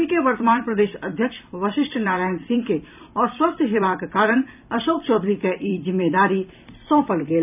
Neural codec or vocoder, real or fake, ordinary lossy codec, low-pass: vocoder, 44.1 kHz, 128 mel bands every 256 samples, BigVGAN v2; fake; none; 3.6 kHz